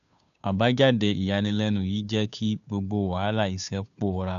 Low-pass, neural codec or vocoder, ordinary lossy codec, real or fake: 7.2 kHz; codec, 16 kHz, 2 kbps, FunCodec, trained on Chinese and English, 25 frames a second; none; fake